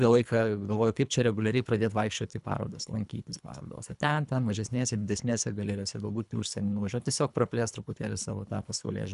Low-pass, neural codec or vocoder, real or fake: 10.8 kHz; codec, 24 kHz, 3 kbps, HILCodec; fake